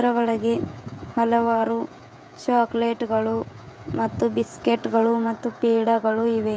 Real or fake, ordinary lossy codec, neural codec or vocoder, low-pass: fake; none; codec, 16 kHz, 16 kbps, FreqCodec, smaller model; none